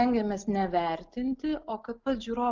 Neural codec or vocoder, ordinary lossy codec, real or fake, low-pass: none; Opus, 32 kbps; real; 7.2 kHz